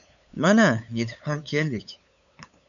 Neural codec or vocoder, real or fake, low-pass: codec, 16 kHz, 8 kbps, FunCodec, trained on LibriTTS, 25 frames a second; fake; 7.2 kHz